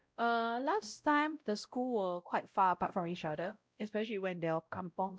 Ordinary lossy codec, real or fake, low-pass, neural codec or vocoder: none; fake; none; codec, 16 kHz, 0.5 kbps, X-Codec, WavLM features, trained on Multilingual LibriSpeech